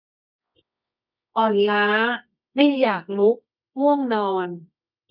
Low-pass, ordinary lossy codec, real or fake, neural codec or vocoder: 5.4 kHz; none; fake; codec, 24 kHz, 0.9 kbps, WavTokenizer, medium music audio release